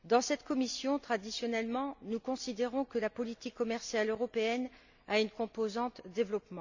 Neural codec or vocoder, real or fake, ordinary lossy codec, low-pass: none; real; none; 7.2 kHz